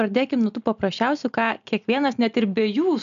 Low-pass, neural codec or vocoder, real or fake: 7.2 kHz; none; real